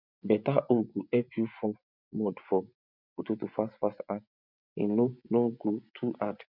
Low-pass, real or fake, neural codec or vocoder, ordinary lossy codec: 5.4 kHz; fake; vocoder, 24 kHz, 100 mel bands, Vocos; none